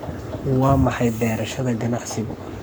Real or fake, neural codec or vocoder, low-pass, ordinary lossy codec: fake; codec, 44.1 kHz, 7.8 kbps, Pupu-Codec; none; none